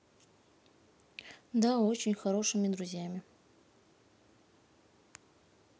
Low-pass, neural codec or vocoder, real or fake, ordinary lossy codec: none; none; real; none